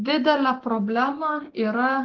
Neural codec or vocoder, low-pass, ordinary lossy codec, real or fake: none; 7.2 kHz; Opus, 16 kbps; real